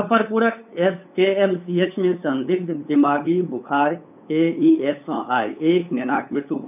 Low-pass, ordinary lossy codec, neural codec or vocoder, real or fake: 3.6 kHz; none; codec, 16 kHz, 8 kbps, FunCodec, trained on LibriTTS, 25 frames a second; fake